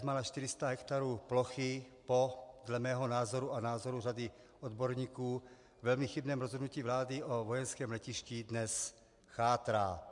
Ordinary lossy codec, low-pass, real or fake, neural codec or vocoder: MP3, 64 kbps; 10.8 kHz; real; none